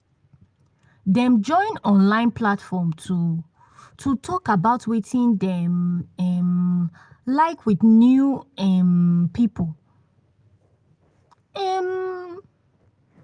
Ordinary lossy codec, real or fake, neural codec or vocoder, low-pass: Opus, 32 kbps; real; none; 9.9 kHz